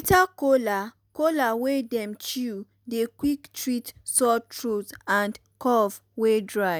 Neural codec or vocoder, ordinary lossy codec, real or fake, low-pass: none; none; real; none